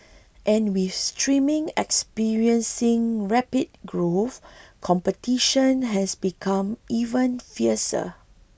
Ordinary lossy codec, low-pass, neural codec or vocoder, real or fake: none; none; none; real